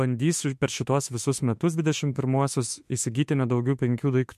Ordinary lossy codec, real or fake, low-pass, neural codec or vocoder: MP3, 64 kbps; fake; 14.4 kHz; autoencoder, 48 kHz, 32 numbers a frame, DAC-VAE, trained on Japanese speech